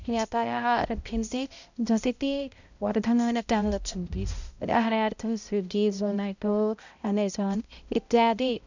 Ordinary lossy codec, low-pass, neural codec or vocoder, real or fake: none; 7.2 kHz; codec, 16 kHz, 0.5 kbps, X-Codec, HuBERT features, trained on balanced general audio; fake